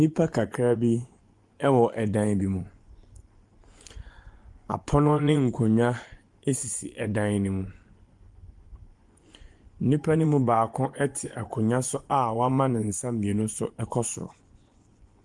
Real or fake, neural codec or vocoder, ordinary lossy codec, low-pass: fake; vocoder, 24 kHz, 100 mel bands, Vocos; Opus, 24 kbps; 10.8 kHz